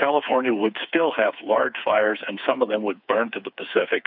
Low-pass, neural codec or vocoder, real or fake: 5.4 kHz; codec, 16 kHz, 4 kbps, FreqCodec, smaller model; fake